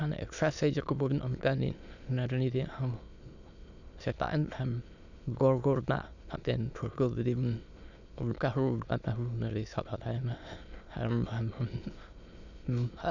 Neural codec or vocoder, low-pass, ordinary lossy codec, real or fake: autoencoder, 22.05 kHz, a latent of 192 numbers a frame, VITS, trained on many speakers; 7.2 kHz; none; fake